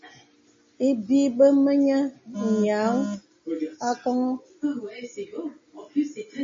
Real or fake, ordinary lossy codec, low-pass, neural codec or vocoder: real; MP3, 32 kbps; 7.2 kHz; none